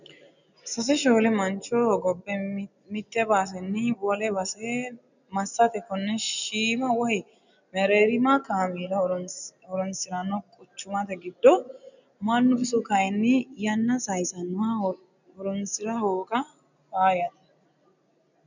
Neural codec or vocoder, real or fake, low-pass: none; real; 7.2 kHz